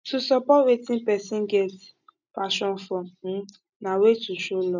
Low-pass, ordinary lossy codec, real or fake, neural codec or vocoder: 7.2 kHz; none; real; none